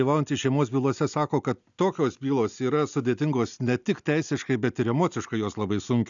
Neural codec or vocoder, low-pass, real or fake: none; 7.2 kHz; real